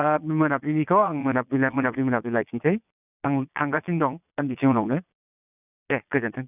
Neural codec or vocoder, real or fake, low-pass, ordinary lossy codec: vocoder, 22.05 kHz, 80 mel bands, Vocos; fake; 3.6 kHz; none